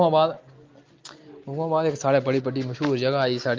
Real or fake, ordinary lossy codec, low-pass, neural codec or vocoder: real; Opus, 32 kbps; 7.2 kHz; none